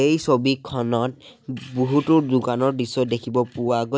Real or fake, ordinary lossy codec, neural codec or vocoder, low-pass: real; none; none; none